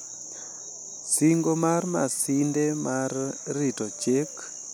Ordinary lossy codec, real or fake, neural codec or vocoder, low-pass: none; real; none; none